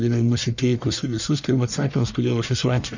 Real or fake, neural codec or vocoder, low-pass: fake; codec, 44.1 kHz, 1.7 kbps, Pupu-Codec; 7.2 kHz